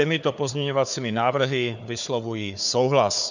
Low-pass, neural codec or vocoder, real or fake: 7.2 kHz; codec, 16 kHz, 4 kbps, FunCodec, trained on Chinese and English, 50 frames a second; fake